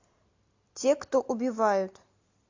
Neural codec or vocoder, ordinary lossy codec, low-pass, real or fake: none; AAC, 48 kbps; 7.2 kHz; real